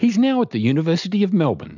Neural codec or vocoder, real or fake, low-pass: none; real; 7.2 kHz